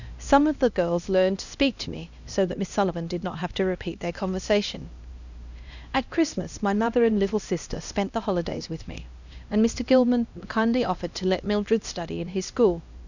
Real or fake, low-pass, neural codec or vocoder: fake; 7.2 kHz; codec, 16 kHz, 1 kbps, X-Codec, HuBERT features, trained on LibriSpeech